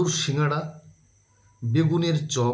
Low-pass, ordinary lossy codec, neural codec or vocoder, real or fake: none; none; none; real